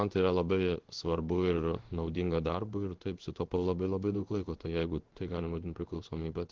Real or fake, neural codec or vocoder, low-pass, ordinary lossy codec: fake; codec, 16 kHz in and 24 kHz out, 1 kbps, XY-Tokenizer; 7.2 kHz; Opus, 16 kbps